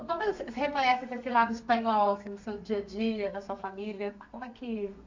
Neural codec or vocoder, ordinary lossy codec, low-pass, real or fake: codec, 32 kHz, 1.9 kbps, SNAC; MP3, 48 kbps; 7.2 kHz; fake